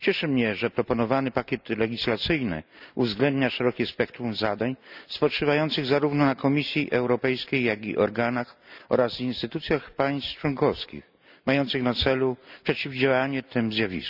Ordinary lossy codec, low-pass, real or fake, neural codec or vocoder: none; 5.4 kHz; real; none